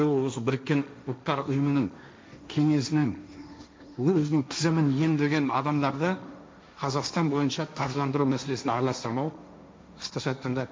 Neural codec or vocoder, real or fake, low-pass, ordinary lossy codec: codec, 16 kHz, 1.1 kbps, Voila-Tokenizer; fake; none; none